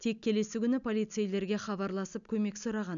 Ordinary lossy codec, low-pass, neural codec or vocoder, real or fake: none; 7.2 kHz; none; real